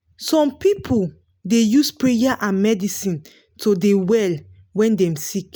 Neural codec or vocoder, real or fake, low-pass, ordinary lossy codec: none; real; none; none